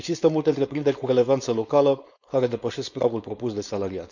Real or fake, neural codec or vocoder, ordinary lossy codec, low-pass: fake; codec, 16 kHz, 4.8 kbps, FACodec; none; 7.2 kHz